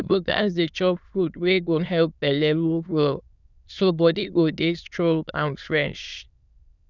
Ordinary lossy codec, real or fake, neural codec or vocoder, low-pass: none; fake; autoencoder, 22.05 kHz, a latent of 192 numbers a frame, VITS, trained on many speakers; 7.2 kHz